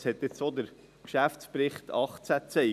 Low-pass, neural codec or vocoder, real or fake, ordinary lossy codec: 14.4 kHz; none; real; none